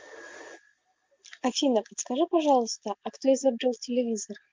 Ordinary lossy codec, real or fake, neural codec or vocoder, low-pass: Opus, 32 kbps; real; none; 7.2 kHz